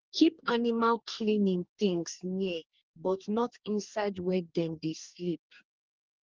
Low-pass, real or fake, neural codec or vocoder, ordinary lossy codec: 7.2 kHz; fake; codec, 44.1 kHz, 2.6 kbps, DAC; Opus, 24 kbps